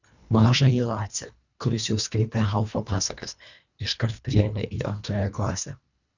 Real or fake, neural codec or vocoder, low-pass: fake; codec, 24 kHz, 1.5 kbps, HILCodec; 7.2 kHz